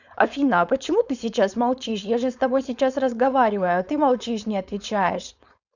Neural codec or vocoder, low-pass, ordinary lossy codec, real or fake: codec, 16 kHz, 4.8 kbps, FACodec; 7.2 kHz; none; fake